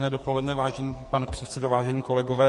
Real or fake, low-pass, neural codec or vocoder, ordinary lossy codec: fake; 14.4 kHz; codec, 44.1 kHz, 2.6 kbps, SNAC; MP3, 48 kbps